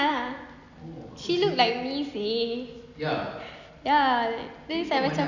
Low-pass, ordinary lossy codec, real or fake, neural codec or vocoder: 7.2 kHz; none; real; none